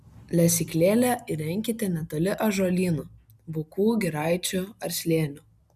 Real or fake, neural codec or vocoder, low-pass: real; none; 14.4 kHz